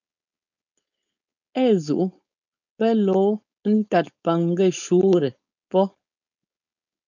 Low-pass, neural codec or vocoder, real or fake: 7.2 kHz; codec, 16 kHz, 4.8 kbps, FACodec; fake